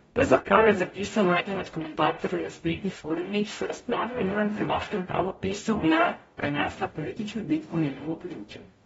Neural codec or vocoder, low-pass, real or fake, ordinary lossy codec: codec, 44.1 kHz, 0.9 kbps, DAC; 19.8 kHz; fake; AAC, 24 kbps